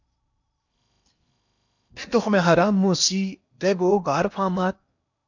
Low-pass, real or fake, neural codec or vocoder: 7.2 kHz; fake; codec, 16 kHz in and 24 kHz out, 0.8 kbps, FocalCodec, streaming, 65536 codes